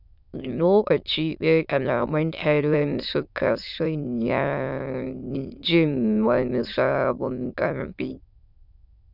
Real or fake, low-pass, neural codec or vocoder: fake; 5.4 kHz; autoencoder, 22.05 kHz, a latent of 192 numbers a frame, VITS, trained on many speakers